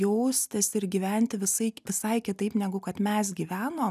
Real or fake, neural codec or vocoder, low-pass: real; none; 14.4 kHz